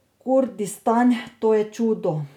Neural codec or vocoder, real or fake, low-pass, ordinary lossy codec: none; real; 19.8 kHz; none